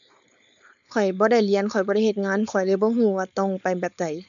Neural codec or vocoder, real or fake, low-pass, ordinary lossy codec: codec, 16 kHz, 4.8 kbps, FACodec; fake; 7.2 kHz; none